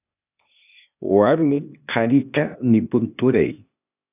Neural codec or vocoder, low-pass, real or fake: codec, 16 kHz, 0.8 kbps, ZipCodec; 3.6 kHz; fake